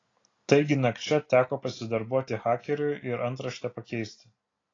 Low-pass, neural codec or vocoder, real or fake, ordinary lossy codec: 7.2 kHz; none; real; AAC, 32 kbps